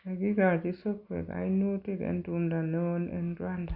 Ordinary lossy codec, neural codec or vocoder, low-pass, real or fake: Opus, 64 kbps; none; 5.4 kHz; real